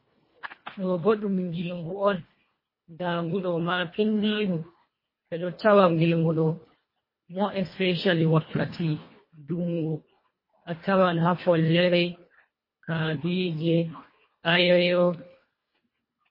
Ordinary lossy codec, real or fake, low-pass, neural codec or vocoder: MP3, 24 kbps; fake; 5.4 kHz; codec, 24 kHz, 1.5 kbps, HILCodec